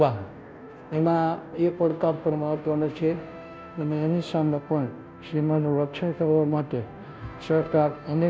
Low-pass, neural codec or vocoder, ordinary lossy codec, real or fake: none; codec, 16 kHz, 0.5 kbps, FunCodec, trained on Chinese and English, 25 frames a second; none; fake